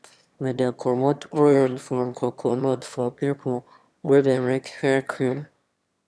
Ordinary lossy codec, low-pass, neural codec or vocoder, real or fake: none; none; autoencoder, 22.05 kHz, a latent of 192 numbers a frame, VITS, trained on one speaker; fake